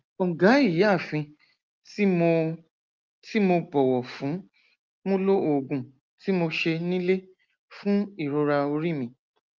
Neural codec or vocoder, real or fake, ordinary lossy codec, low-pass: none; real; Opus, 32 kbps; 7.2 kHz